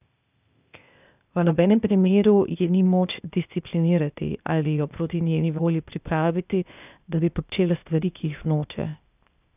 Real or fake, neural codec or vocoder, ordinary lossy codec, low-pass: fake; codec, 16 kHz, 0.8 kbps, ZipCodec; none; 3.6 kHz